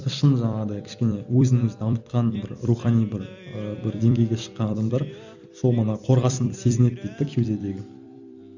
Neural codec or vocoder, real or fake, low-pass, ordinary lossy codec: vocoder, 44.1 kHz, 128 mel bands every 256 samples, BigVGAN v2; fake; 7.2 kHz; none